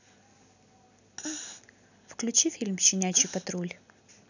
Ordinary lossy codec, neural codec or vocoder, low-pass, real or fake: none; none; 7.2 kHz; real